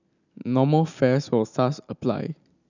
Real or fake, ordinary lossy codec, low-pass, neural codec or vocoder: real; none; 7.2 kHz; none